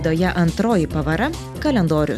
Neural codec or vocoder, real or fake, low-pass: none; real; 14.4 kHz